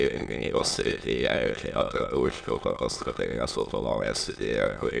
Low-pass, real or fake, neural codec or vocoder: 9.9 kHz; fake; autoencoder, 22.05 kHz, a latent of 192 numbers a frame, VITS, trained on many speakers